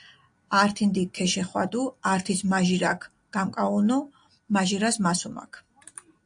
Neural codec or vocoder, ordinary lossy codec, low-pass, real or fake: none; AAC, 64 kbps; 9.9 kHz; real